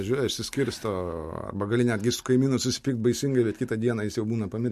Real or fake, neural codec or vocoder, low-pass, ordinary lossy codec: real; none; 14.4 kHz; MP3, 64 kbps